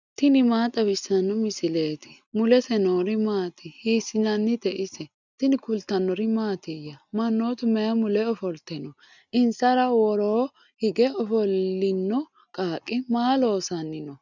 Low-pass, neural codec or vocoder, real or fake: 7.2 kHz; none; real